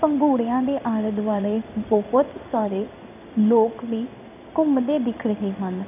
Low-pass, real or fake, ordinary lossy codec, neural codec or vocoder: 3.6 kHz; fake; none; codec, 16 kHz in and 24 kHz out, 1 kbps, XY-Tokenizer